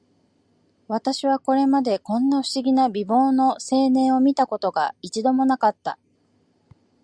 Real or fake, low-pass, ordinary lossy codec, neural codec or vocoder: real; 9.9 kHz; Opus, 64 kbps; none